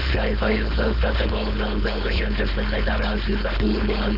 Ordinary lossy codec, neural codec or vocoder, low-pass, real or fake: none; codec, 16 kHz, 4.8 kbps, FACodec; 5.4 kHz; fake